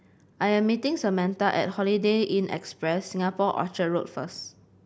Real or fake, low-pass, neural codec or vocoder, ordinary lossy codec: real; none; none; none